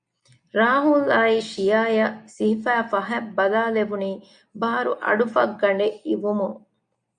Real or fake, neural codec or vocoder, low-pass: real; none; 9.9 kHz